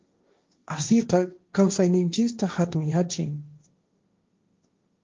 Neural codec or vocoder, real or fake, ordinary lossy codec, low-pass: codec, 16 kHz, 1.1 kbps, Voila-Tokenizer; fake; Opus, 32 kbps; 7.2 kHz